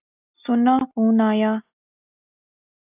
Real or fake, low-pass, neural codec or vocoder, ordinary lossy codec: real; 3.6 kHz; none; AAC, 32 kbps